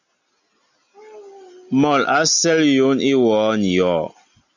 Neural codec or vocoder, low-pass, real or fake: none; 7.2 kHz; real